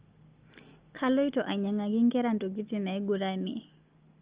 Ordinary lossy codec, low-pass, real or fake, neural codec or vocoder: Opus, 24 kbps; 3.6 kHz; real; none